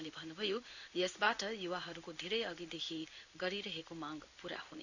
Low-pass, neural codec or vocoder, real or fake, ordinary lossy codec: 7.2 kHz; codec, 16 kHz in and 24 kHz out, 1 kbps, XY-Tokenizer; fake; none